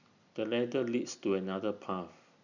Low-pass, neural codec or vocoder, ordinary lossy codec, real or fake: 7.2 kHz; none; none; real